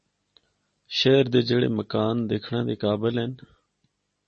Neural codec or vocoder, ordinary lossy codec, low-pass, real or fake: none; MP3, 32 kbps; 10.8 kHz; real